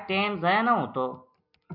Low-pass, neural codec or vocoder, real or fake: 5.4 kHz; none; real